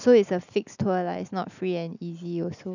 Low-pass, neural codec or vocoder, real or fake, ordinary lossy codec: 7.2 kHz; none; real; none